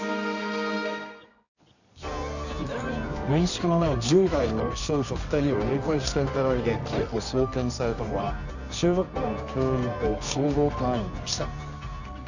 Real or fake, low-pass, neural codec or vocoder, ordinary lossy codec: fake; 7.2 kHz; codec, 24 kHz, 0.9 kbps, WavTokenizer, medium music audio release; none